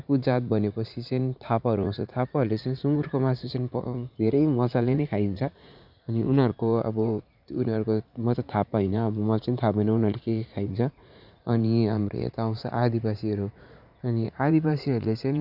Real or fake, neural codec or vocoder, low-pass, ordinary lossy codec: fake; vocoder, 44.1 kHz, 80 mel bands, Vocos; 5.4 kHz; none